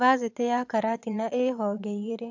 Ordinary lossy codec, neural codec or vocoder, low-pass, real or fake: AAC, 48 kbps; vocoder, 22.05 kHz, 80 mel bands, Vocos; 7.2 kHz; fake